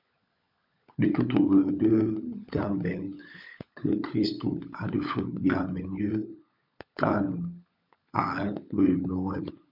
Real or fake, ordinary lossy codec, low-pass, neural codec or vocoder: fake; MP3, 48 kbps; 5.4 kHz; codec, 16 kHz, 16 kbps, FunCodec, trained on LibriTTS, 50 frames a second